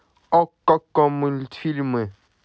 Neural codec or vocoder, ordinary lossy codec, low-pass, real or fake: none; none; none; real